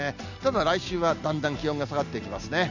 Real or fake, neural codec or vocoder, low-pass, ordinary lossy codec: real; none; 7.2 kHz; none